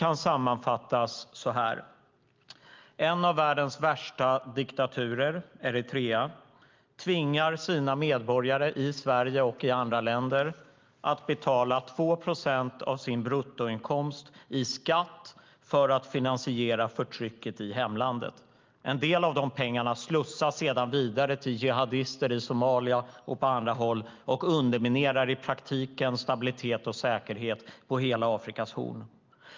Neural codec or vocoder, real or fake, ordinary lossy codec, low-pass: none; real; Opus, 24 kbps; 7.2 kHz